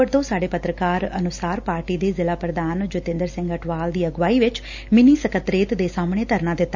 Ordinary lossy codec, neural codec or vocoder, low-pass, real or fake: none; none; 7.2 kHz; real